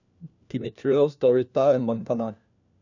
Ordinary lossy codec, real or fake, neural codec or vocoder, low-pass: none; fake; codec, 16 kHz, 1 kbps, FunCodec, trained on LibriTTS, 50 frames a second; 7.2 kHz